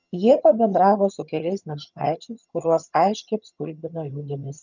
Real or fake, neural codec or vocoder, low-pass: fake; vocoder, 22.05 kHz, 80 mel bands, HiFi-GAN; 7.2 kHz